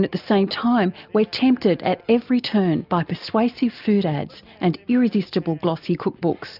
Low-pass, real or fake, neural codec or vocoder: 5.4 kHz; real; none